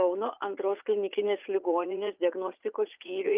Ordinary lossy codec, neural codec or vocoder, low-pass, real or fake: Opus, 24 kbps; vocoder, 44.1 kHz, 80 mel bands, Vocos; 3.6 kHz; fake